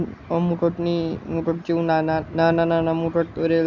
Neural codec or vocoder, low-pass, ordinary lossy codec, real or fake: none; 7.2 kHz; Opus, 64 kbps; real